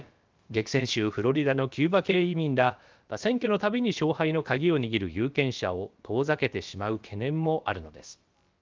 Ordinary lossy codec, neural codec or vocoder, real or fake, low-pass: Opus, 24 kbps; codec, 16 kHz, about 1 kbps, DyCAST, with the encoder's durations; fake; 7.2 kHz